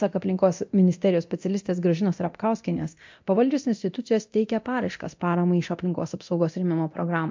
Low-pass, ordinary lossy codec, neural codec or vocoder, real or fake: 7.2 kHz; MP3, 48 kbps; codec, 24 kHz, 0.9 kbps, DualCodec; fake